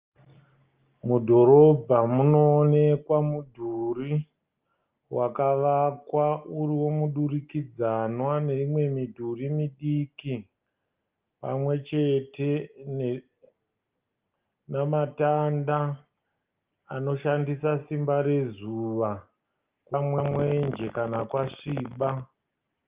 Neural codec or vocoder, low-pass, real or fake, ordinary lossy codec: none; 3.6 kHz; real; Opus, 32 kbps